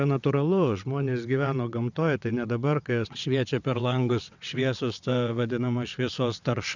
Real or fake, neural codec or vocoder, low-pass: fake; vocoder, 22.05 kHz, 80 mel bands, WaveNeXt; 7.2 kHz